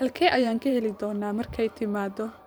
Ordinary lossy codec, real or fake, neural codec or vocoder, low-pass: none; fake; vocoder, 44.1 kHz, 128 mel bands every 512 samples, BigVGAN v2; none